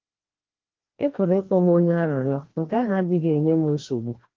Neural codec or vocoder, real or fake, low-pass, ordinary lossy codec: codec, 16 kHz, 1 kbps, FreqCodec, larger model; fake; 7.2 kHz; Opus, 16 kbps